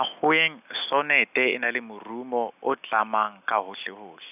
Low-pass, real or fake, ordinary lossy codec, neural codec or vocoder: 3.6 kHz; real; none; none